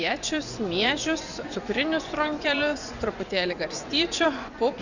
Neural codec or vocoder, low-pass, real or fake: vocoder, 24 kHz, 100 mel bands, Vocos; 7.2 kHz; fake